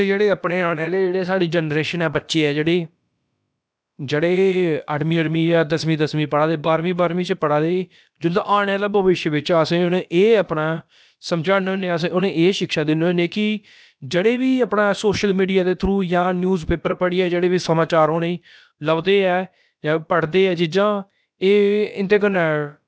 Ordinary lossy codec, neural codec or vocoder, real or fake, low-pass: none; codec, 16 kHz, about 1 kbps, DyCAST, with the encoder's durations; fake; none